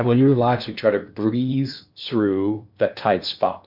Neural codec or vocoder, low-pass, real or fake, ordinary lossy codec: codec, 16 kHz in and 24 kHz out, 0.8 kbps, FocalCodec, streaming, 65536 codes; 5.4 kHz; fake; MP3, 48 kbps